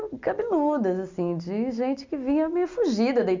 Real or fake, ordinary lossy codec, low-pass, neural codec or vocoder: real; MP3, 64 kbps; 7.2 kHz; none